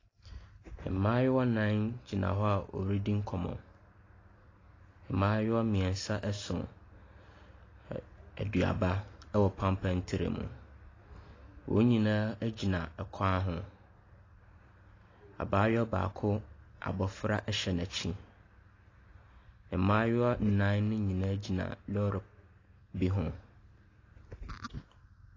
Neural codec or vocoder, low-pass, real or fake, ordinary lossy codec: none; 7.2 kHz; real; AAC, 32 kbps